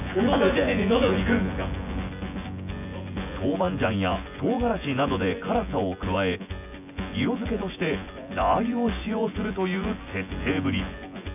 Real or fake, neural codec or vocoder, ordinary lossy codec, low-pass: fake; vocoder, 24 kHz, 100 mel bands, Vocos; none; 3.6 kHz